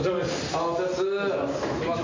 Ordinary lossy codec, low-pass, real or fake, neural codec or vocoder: MP3, 48 kbps; 7.2 kHz; real; none